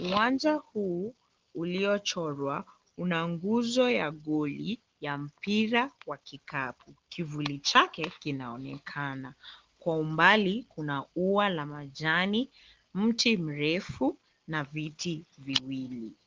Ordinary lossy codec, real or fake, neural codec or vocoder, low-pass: Opus, 16 kbps; real; none; 7.2 kHz